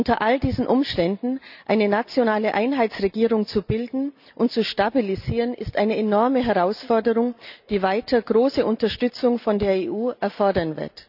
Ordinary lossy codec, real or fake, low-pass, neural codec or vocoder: none; real; 5.4 kHz; none